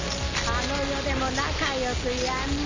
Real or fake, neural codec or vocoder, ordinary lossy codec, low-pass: real; none; MP3, 64 kbps; 7.2 kHz